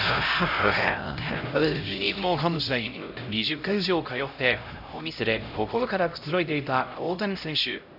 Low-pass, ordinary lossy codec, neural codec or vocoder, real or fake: 5.4 kHz; none; codec, 16 kHz, 0.5 kbps, X-Codec, HuBERT features, trained on LibriSpeech; fake